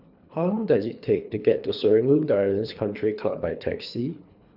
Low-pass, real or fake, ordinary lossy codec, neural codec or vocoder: 5.4 kHz; fake; none; codec, 24 kHz, 3 kbps, HILCodec